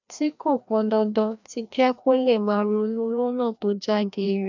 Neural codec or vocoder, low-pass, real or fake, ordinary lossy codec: codec, 16 kHz, 1 kbps, FreqCodec, larger model; 7.2 kHz; fake; none